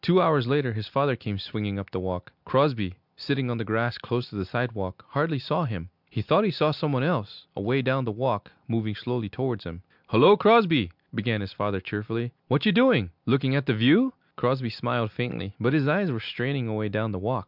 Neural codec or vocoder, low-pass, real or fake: none; 5.4 kHz; real